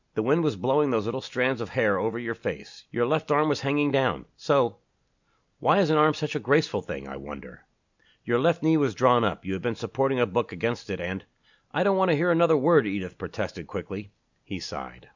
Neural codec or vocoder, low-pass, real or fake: none; 7.2 kHz; real